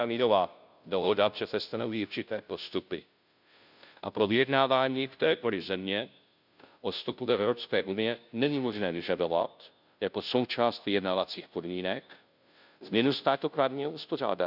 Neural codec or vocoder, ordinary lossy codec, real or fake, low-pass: codec, 16 kHz, 0.5 kbps, FunCodec, trained on Chinese and English, 25 frames a second; none; fake; 5.4 kHz